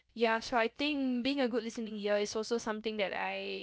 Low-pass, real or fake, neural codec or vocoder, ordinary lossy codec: none; fake; codec, 16 kHz, about 1 kbps, DyCAST, with the encoder's durations; none